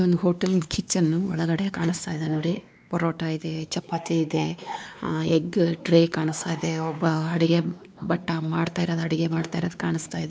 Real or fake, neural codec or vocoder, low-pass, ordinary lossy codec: fake; codec, 16 kHz, 2 kbps, X-Codec, WavLM features, trained on Multilingual LibriSpeech; none; none